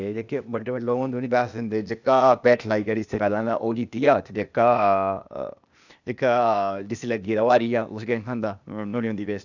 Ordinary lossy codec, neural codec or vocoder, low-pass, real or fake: none; codec, 16 kHz, 0.8 kbps, ZipCodec; 7.2 kHz; fake